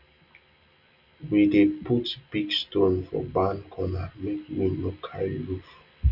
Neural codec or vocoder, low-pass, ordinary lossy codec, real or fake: none; 5.4 kHz; none; real